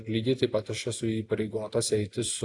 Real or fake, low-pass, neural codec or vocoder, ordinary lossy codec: real; 10.8 kHz; none; AAC, 48 kbps